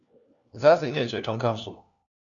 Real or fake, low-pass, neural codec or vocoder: fake; 7.2 kHz; codec, 16 kHz, 1 kbps, FunCodec, trained on LibriTTS, 50 frames a second